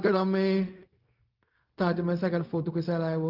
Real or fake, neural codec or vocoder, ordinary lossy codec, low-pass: fake; codec, 16 kHz, 0.4 kbps, LongCat-Audio-Codec; Opus, 32 kbps; 5.4 kHz